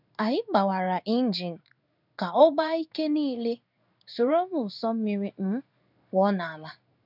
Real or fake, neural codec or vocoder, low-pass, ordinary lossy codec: fake; codec, 16 kHz in and 24 kHz out, 1 kbps, XY-Tokenizer; 5.4 kHz; none